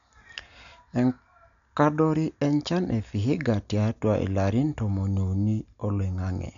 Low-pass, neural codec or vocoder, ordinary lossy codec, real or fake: 7.2 kHz; none; none; real